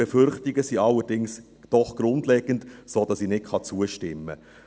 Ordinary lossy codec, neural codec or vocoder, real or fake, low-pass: none; none; real; none